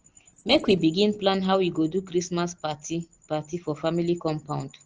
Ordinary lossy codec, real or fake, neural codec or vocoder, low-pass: Opus, 16 kbps; real; none; 7.2 kHz